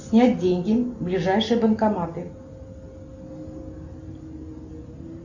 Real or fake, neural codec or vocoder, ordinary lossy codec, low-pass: real; none; Opus, 64 kbps; 7.2 kHz